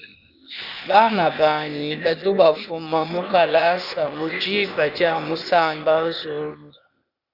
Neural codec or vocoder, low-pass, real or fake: codec, 16 kHz, 0.8 kbps, ZipCodec; 5.4 kHz; fake